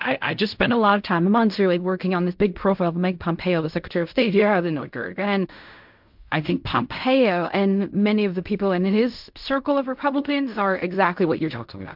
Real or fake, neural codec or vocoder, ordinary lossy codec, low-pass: fake; codec, 16 kHz in and 24 kHz out, 0.4 kbps, LongCat-Audio-Codec, fine tuned four codebook decoder; MP3, 48 kbps; 5.4 kHz